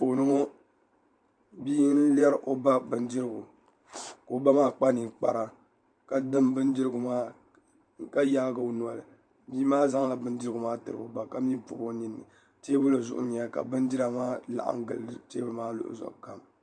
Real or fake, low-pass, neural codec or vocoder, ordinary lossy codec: fake; 9.9 kHz; vocoder, 44.1 kHz, 128 mel bands every 512 samples, BigVGAN v2; MP3, 64 kbps